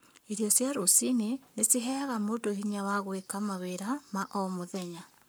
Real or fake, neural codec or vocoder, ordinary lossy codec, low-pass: fake; codec, 44.1 kHz, 7.8 kbps, Pupu-Codec; none; none